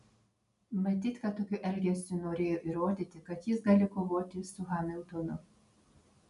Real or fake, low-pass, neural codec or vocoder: real; 10.8 kHz; none